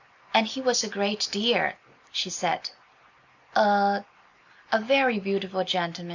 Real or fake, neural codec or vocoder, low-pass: real; none; 7.2 kHz